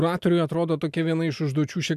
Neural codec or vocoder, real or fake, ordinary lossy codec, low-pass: none; real; MP3, 96 kbps; 14.4 kHz